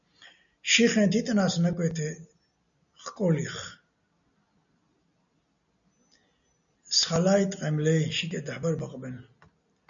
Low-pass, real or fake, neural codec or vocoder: 7.2 kHz; real; none